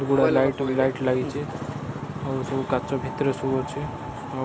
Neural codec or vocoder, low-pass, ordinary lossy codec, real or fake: none; none; none; real